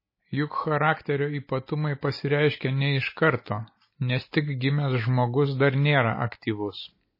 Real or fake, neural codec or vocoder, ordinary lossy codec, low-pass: real; none; MP3, 24 kbps; 5.4 kHz